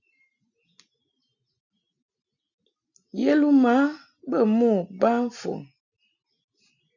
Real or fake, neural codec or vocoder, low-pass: real; none; 7.2 kHz